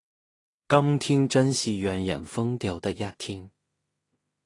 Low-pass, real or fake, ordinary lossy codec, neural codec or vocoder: 10.8 kHz; fake; AAC, 32 kbps; codec, 16 kHz in and 24 kHz out, 0.4 kbps, LongCat-Audio-Codec, two codebook decoder